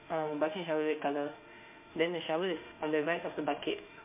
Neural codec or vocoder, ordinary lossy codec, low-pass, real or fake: autoencoder, 48 kHz, 32 numbers a frame, DAC-VAE, trained on Japanese speech; MP3, 24 kbps; 3.6 kHz; fake